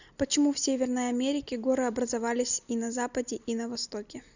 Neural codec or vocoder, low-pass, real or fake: none; 7.2 kHz; real